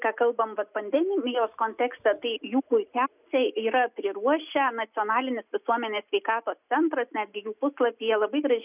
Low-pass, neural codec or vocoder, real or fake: 3.6 kHz; none; real